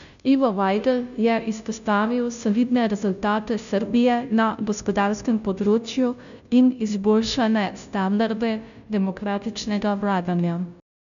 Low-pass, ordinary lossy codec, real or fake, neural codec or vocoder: 7.2 kHz; none; fake; codec, 16 kHz, 0.5 kbps, FunCodec, trained on Chinese and English, 25 frames a second